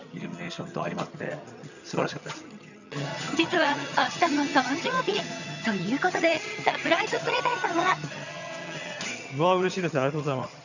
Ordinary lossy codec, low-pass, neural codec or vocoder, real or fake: none; 7.2 kHz; vocoder, 22.05 kHz, 80 mel bands, HiFi-GAN; fake